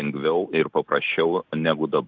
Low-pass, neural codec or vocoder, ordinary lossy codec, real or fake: 7.2 kHz; none; Opus, 64 kbps; real